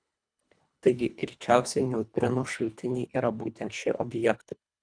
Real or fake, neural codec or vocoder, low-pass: fake; codec, 24 kHz, 1.5 kbps, HILCodec; 9.9 kHz